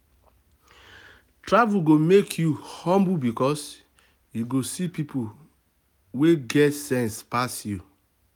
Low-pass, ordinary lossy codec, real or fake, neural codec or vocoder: none; none; real; none